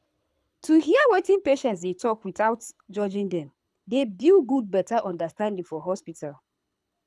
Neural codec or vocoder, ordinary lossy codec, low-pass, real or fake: codec, 24 kHz, 6 kbps, HILCodec; none; none; fake